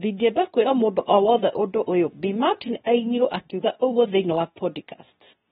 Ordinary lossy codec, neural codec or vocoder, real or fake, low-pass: AAC, 16 kbps; codec, 24 kHz, 0.9 kbps, WavTokenizer, medium speech release version 2; fake; 10.8 kHz